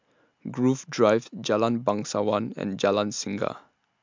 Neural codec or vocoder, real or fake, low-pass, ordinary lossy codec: none; real; 7.2 kHz; MP3, 64 kbps